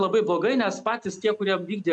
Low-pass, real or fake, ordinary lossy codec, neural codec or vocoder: 10.8 kHz; real; MP3, 96 kbps; none